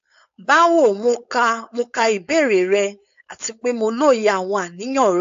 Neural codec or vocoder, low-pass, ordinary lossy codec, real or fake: codec, 16 kHz, 4.8 kbps, FACodec; 7.2 kHz; AAC, 64 kbps; fake